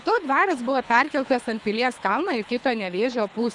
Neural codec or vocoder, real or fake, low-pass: codec, 24 kHz, 3 kbps, HILCodec; fake; 10.8 kHz